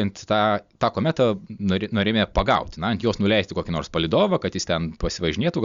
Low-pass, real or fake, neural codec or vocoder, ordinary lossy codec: 7.2 kHz; real; none; Opus, 64 kbps